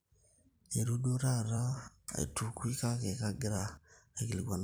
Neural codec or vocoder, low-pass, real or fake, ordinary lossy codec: vocoder, 44.1 kHz, 128 mel bands every 256 samples, BigVGAN v2; none; fake; none